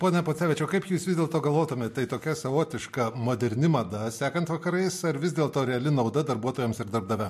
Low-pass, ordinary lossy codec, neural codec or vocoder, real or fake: 14.4 kHz; MP3, 64 kbps; none; real